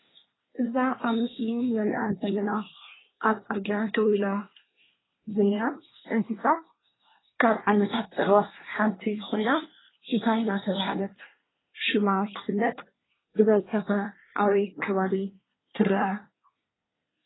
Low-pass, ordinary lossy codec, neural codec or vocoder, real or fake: 7.2 kHz; AAC, 16 kbps; codec, 24 kHz, 1 kbps, SNAC; fake